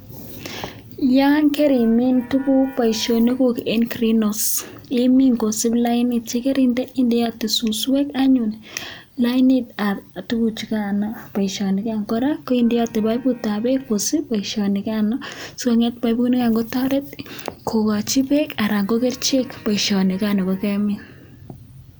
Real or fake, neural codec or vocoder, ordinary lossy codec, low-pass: real; none; none; none